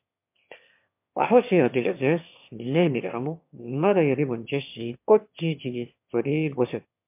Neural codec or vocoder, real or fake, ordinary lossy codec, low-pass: autoencoder, 22.05 kHz, a latent of 192 numbers a frame, VITS, trained on one speaker; fake; MP3, 32 kbps; 3.6 kHz